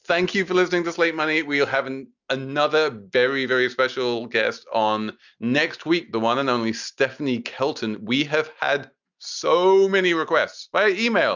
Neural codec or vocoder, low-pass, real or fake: none; 7.2 kHz; real